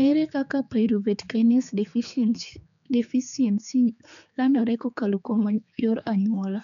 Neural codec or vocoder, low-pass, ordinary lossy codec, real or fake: codec, 16 kHz, 4 kbps, X-Codec, HuBERT features, trained on general audio; 7.2 kHz; none; fake